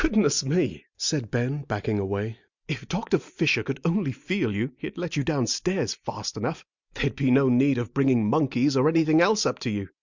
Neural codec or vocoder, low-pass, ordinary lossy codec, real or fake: none; 7.2 kHz; Opus, 64 kbps; real